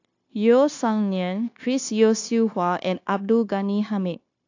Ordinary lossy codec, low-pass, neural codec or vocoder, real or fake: AAC, 48 kbps; 7.2 kHz; codec, 16 kHz, 0.9 kbps, LongCat-Audio-Codec; fake